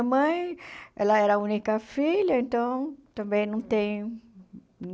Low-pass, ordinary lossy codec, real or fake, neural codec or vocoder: none; none; real; none